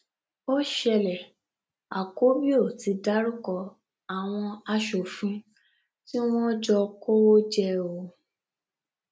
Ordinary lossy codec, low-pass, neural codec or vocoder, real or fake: none; none; none; real